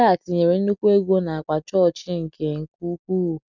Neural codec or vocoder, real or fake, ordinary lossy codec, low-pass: none; real; none; 7.2 kHz